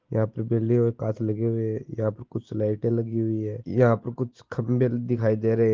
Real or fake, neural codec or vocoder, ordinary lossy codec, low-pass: real; none; Opus, 16 kbps; 7.2 kHz